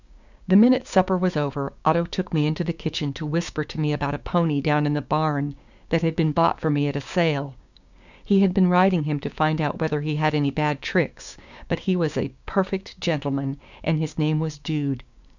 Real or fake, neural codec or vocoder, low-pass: fake; codec, 16 kHz, 6 kbps, DAC; 7.2 kHz